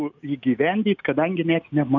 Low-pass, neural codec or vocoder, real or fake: 7.2 kHz; none; real